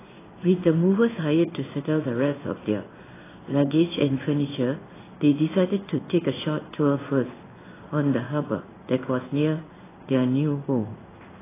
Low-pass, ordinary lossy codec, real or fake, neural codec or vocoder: 3.6 kHz; AAC, 16 kbps; fake; codec, 16 kHz in and 24 kHz out, 1 kbps, XY-Tokenizer